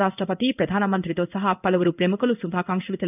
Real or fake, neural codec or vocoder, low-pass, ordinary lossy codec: fake; codec, 16 kHz in and 24 kHz out, 1 kbps, XY-Tokenizer; 3.6 kHz; none